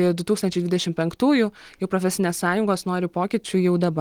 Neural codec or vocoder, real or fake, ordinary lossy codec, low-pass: none; real; Opus, 24 kbps; 19.8 kHz